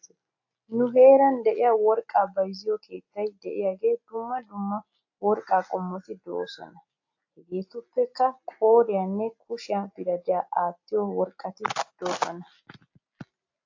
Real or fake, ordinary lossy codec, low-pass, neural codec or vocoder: real; AAC, 48 kbps; 7.2 kHz; none